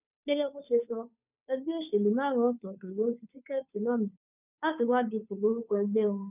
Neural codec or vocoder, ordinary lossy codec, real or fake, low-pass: codec, 16 kHz, 2 kbps, FunCodec, trained on Chinese and English, 25 frames a second; none; fake; 3.6 kHz